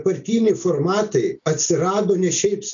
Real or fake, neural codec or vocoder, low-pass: real; none; 7.2 kHz